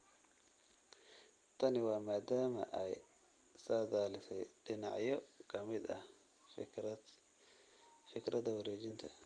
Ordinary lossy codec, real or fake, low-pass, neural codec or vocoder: Opus, 32 kbps; real; 9.9 kHz; none